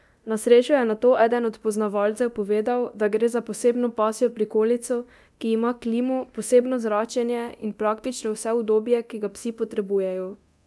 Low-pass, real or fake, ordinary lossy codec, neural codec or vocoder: none; fake; none; codec, 24 kHz, 0.9 kbps, DualCodec